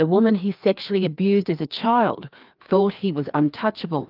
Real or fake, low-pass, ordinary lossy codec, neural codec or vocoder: fake; 5.4 kHz; Opus, 24 kbps; codec, 16 kHz, 2 kbps, FreqCodec, larger model